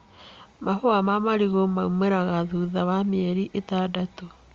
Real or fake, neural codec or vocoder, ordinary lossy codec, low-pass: real; none; Opus, 32 kbps; 7.2 kHz